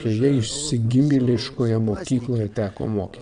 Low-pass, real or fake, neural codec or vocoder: 9.9 kHz; fake; vocoder, 22.05 kHz, 80 mel bands, WaveNeXt